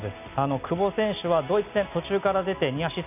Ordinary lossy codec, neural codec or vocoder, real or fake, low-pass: none; none; real; 3.6 kHz